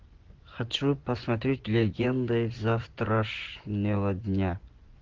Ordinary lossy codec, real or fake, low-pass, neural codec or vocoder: Opus, 16 kbps; fake; 7.2 kHz; vocoder, 24 kHz, 100 mel bands, Vocos